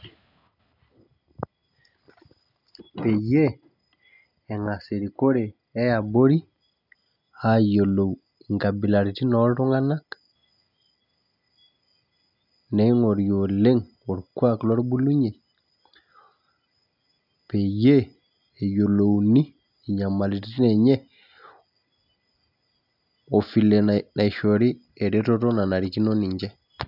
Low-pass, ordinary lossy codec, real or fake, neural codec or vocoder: 5.4 kHz; none; real; none